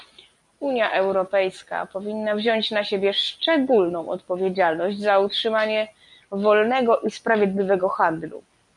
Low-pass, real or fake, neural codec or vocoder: 10.8 kHz; real; none